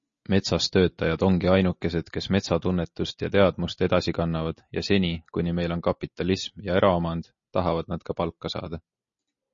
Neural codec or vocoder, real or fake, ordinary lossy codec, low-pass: none; real; MP3, 32 kbps; 7.2 kHz